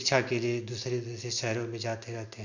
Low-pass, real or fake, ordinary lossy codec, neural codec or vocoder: 7.2 kHz; real; none; none